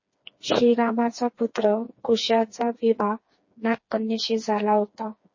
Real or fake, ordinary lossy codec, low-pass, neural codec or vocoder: fake; MP3, 32 kbps; 7.2 kHz; codec, 16 kHz, 4 kbps, FreqCodec, smaller model